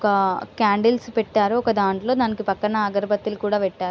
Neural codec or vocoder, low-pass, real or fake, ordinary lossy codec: none; none; real; none